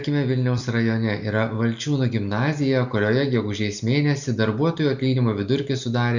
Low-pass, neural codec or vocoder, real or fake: 7.2 kHz; none; real